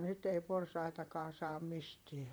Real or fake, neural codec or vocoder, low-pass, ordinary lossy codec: fake; vocoder, 44.1 kHz, 128 mel bands, Pupu-Vocoder; none; none